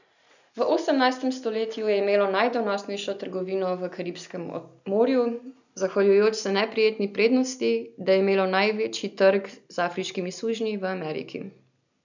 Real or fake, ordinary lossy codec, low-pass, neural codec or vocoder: real; none; 7.2 kHz; none